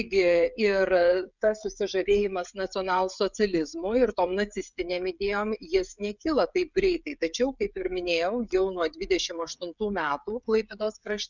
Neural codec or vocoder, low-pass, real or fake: codec, 16 kHz, 16 kbps, FreqCodec, smaller model; 7.2 kHz; fake